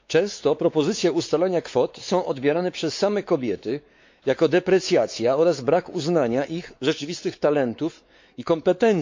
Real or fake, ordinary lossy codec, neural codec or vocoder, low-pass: fake; MP3, 48 kbps; codec, 16 kHz, 4 kbps, X-Codec, WavLM features, trained on Multilingual LibriSpeech; 7.2 kHz